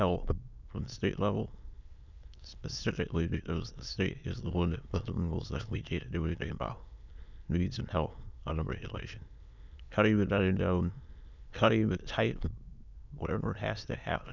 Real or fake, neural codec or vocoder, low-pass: fake; autoencoder, 22.05 kHz, a latent of 192 numbers a frame, VITS, trained on many speakers; 7.2 kHz